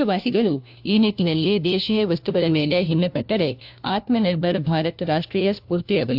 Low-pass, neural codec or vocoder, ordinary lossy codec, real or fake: 5.4 kHz; codec, 16 kHz, 1 kbps, FunCodec, trained on LibriTTS, 50 frames a second; AAC, 48 kbps; fake